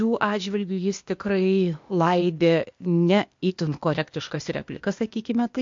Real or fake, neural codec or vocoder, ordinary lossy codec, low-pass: fake; codec, 16 kHz, 0.8 kbps, ZipCodec; MP3, 48 kbps; 7.2 kHz